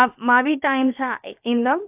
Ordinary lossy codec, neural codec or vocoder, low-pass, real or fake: AAC, 32 kbps; codec, 16 kHz, about 1 kbps, DyCAST, with the encoder's durations; 3.6 kHz; fake